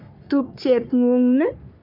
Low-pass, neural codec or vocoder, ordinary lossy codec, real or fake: 5.4 kHz; codec, 44.1 kHz, 3.4 kbps, Pupu-Codec; none; fake